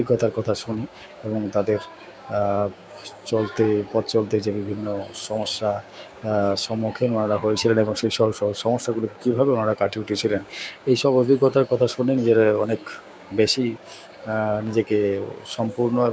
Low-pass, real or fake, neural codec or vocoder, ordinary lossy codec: none; fake; codec, 16 kHz, 6 kbps, DAC; none